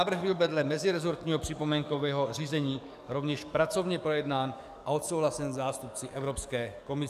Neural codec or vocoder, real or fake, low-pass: codec, 44.1 kHz, 7.8 kbps, DAC; fake; 14.4 kHz